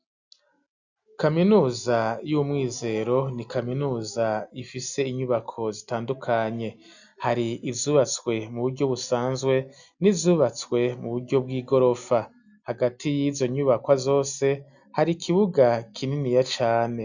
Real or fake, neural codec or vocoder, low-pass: real; none; 7.2 kHz